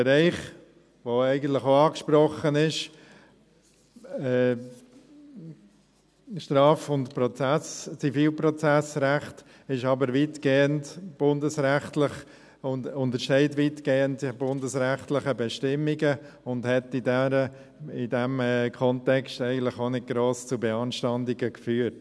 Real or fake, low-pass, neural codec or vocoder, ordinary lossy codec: real; none; none; none